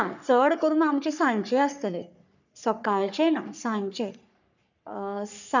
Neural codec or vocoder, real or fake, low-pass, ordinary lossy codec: codec, 44.1 kHz, 3.4 kbps, Pupu-Codec; fake; 7.2 kHz; none